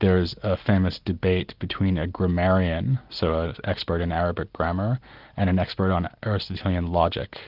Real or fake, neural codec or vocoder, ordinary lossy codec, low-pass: real; none; Opus, 24 kbps; 5.4 kHz